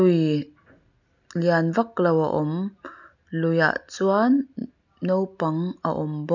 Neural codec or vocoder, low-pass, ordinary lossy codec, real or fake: none; 7.2 kHz; none; real